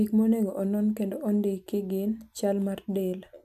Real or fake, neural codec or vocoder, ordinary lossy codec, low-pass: real; none; none; 14.4 kHz